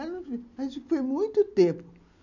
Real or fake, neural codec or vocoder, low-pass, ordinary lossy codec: real; none; 7.2 kHz; none